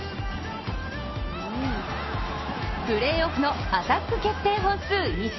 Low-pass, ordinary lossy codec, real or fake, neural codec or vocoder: 7.2 kHz; MP3, 24 kbps; real; none